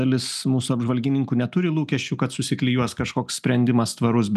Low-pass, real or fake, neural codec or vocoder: 14.4 kHz; real; none